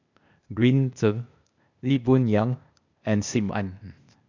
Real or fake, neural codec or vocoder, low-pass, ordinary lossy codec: fake; codec, 16 kHz, 0.8 kbps, ZipCodec; 7.2 kHz; none